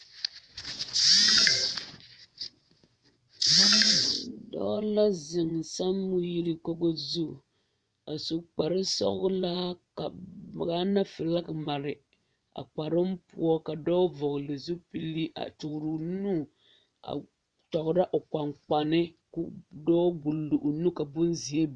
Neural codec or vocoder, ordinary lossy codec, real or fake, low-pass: vocoder, 24 kHz, 100 mel bands, Vocos; Opus, 64 kbps; fake; 9.9 kHz